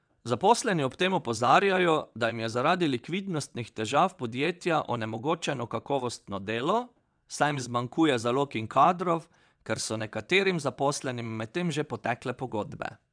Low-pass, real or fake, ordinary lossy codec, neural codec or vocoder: 9.9 kHz; fake; none; vocoder, 22.05 kHz, 80 mel bands, WaveNeXt